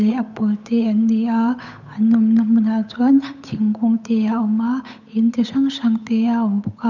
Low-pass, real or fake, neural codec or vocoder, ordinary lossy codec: 7.2 kHz; fake; codec, 16 kHz, 8 kbps, FunCodec, trained on Chinese and English, 25 frames a second; none